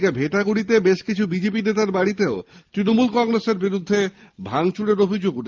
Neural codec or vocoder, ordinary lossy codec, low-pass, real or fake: none; Opus, 24 kbps; 7.2 kHz; real